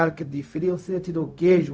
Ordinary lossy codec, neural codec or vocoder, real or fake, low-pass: none; codec, 16 kHz, 0.4 kbps, LongCat-Audio-Codec; fake; none